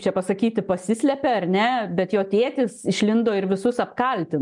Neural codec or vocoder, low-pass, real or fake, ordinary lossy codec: none; 10.8 kHz; real; MP3, 96 kbps